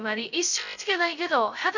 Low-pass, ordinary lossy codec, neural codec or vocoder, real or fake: 7.2 kHz; none; codec, 16 kHz, 0.2 kbps, FocalCodec; fake